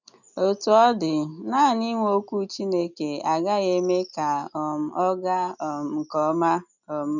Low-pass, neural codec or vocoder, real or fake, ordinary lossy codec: 7.2 kHz; none; real; none